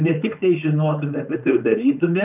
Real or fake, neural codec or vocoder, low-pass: fake; codec, 16 kHz, 4.8 kbps, FACodec; 3.6 kHz